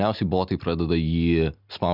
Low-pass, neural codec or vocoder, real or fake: 5.4 kHz; none; real